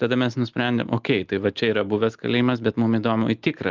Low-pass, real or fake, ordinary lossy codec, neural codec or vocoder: 7.2 kHz; real; Opus, 32 kbps; none